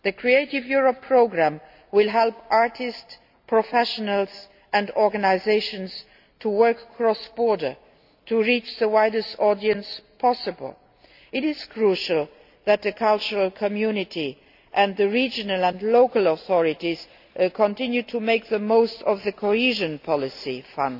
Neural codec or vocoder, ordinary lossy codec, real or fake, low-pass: none; AAC, 48 kbps; real; 5.4 kHz